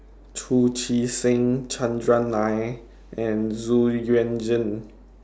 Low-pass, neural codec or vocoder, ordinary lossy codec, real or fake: none; none; none; real